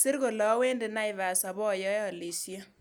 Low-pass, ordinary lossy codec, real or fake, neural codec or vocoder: none; none; real; none